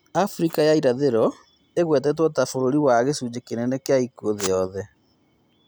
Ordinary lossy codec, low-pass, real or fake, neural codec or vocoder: none; none; real; none